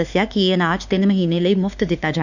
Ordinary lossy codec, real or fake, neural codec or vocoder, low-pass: none; fake; autoencoder, 48 kHz, 32 numbers a frame, DAC-VAE, trained on Japanese speech; 7.2 kHz